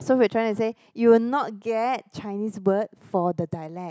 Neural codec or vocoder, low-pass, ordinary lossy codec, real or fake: none; none; none; real